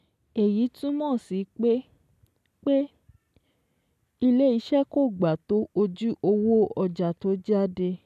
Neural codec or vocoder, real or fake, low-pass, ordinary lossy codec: none; real; 14.4 kHz; none